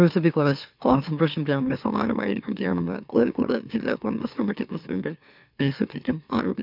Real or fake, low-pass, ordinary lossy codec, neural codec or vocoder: fake; 5.4 kHz; none; autoencoder, 44.1 kHz, a latent of 192 numbers a frame, MeloTTS